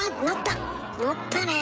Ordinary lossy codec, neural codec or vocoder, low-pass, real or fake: none; codec, 16 kHz, 16 kbps, FreqCodec, smaller model; none; fake